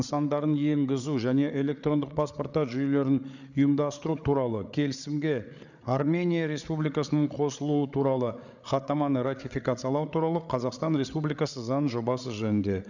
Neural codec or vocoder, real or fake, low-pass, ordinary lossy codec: codec, 16 kHz, 8 kbps, FreqCodec, larger model; fake; 7.2 kHz; none